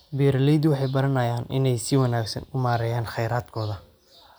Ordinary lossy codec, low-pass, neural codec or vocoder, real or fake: none; none; none; real